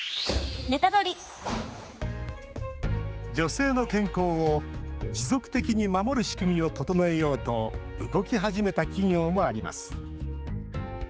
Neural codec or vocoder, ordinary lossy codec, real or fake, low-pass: codec, 16 kHz, 4 kbps, X-Codec, HuBERT features, trained on general audio; none; fake; none